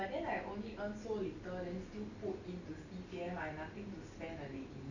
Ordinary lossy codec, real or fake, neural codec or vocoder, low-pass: none; real; none; 7.2 kHz